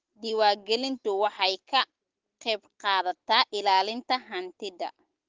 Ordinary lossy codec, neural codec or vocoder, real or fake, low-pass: Opus, 16 kbps; none; real; 7.2 kHz